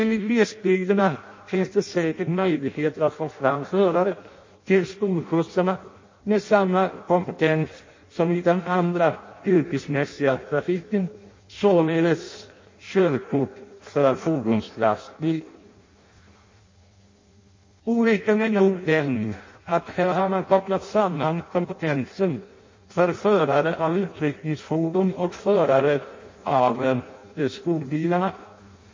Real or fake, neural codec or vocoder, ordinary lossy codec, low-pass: fake; codec, 16 kHz in and 24 kHz out, 0.6 kbps, FireRedTTS-2 codec; MP3, 32 kbps; 7.2 kHz